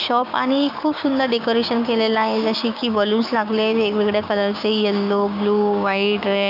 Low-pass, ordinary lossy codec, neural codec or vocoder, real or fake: 5.4 kHz; none; codec, 16 kHz, 6 kbps, DAC; fake